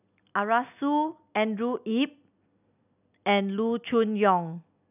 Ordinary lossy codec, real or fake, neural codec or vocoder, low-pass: none; real; none; 3.6 kHz